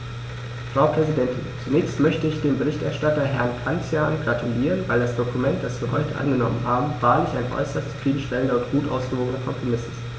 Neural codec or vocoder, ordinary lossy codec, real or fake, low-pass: none; none; real; none